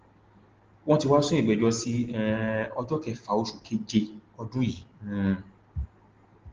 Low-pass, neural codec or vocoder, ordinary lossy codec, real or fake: 7.2 kHz; none; Opus, 16 kbps; real